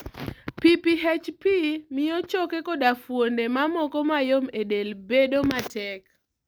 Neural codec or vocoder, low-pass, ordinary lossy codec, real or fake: none; none; none; real